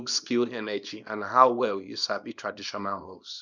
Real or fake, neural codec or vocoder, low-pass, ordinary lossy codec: fake; codec, 24 kHz, 0.9 kbps, WavTokenizer, small release; 7.2 kHz; none